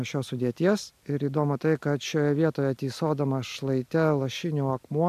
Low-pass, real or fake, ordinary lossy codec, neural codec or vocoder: 14.4 kHz; real; AAC, 96 kbps; none